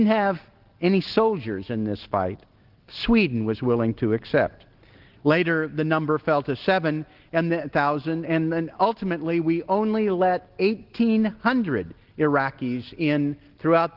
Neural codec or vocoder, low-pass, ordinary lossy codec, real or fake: none; 5.4 kHz; Opus, 16 kbps; real